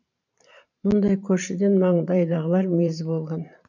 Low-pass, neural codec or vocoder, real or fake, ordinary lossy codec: 7.2 kHz; vocoder, 44.1 kHz, 128 mel bands every 512 samples, BigVGAN v2; fake; none